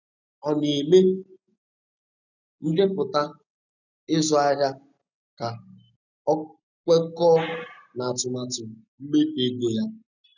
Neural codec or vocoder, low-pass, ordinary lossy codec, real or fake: none; 7.2 kHz; none; real